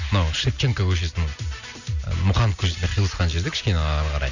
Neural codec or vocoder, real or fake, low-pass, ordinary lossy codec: none; real; 7.2 kHz; none